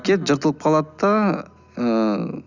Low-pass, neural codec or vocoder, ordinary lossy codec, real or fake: 7.2 kHz; none; none; real